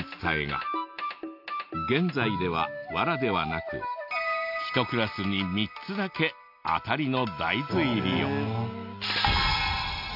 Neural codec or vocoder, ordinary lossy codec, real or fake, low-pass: none; MP3, 48 kbps; real; 5.4 kHz